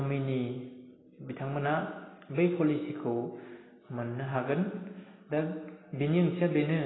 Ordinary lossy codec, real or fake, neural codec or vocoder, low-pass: AAC, 16 kbps; real; none; 7.2 kHz